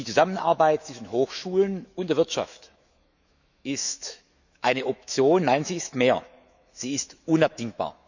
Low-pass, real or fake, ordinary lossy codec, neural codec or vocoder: 7.2 kHz; fake; none; autoencoder, 48 kHz, 128 numbers a frame, DAC-VAE, trained on Japanese speech